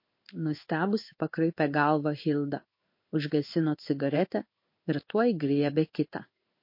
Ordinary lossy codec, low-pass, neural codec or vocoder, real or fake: MP3, 32 kbps; 5.4 kHz; codec, 16 kHz in and 24 kHz out, 1 kbps, XY-Tokenizer; fake